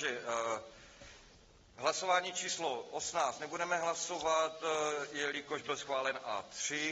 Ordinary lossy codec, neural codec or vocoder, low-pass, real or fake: AAC, 24 kbps; none; 7.2 kHz; real